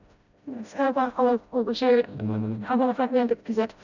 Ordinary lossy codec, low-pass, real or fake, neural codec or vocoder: none; 7.2 kHz; fake; codec, 16 kHz, 0.5 kbps, FreqCodec, smaller model